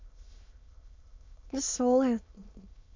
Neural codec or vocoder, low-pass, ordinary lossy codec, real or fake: autoencoder, 22.05 kHz, a latent of 192 numbers a frame, VITS, trained on many speakers; 7.2 kHz; MP3, 48 kbps; fake